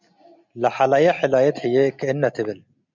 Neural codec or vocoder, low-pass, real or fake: none; 7.2 kHz; real